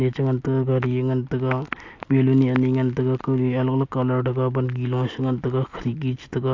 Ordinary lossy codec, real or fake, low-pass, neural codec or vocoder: AAC, 48 kbps; real; 7.2 kHz; none